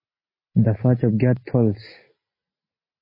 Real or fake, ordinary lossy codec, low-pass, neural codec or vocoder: real; MP3, 24 kbps; 5.4 kHz; none